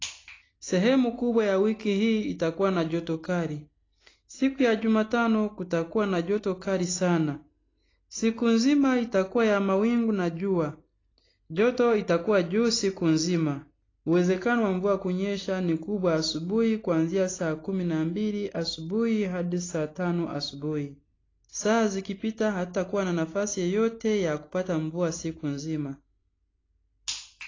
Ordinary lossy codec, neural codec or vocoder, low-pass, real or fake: AAC, 32 kbps; none; 7.2 kHz; real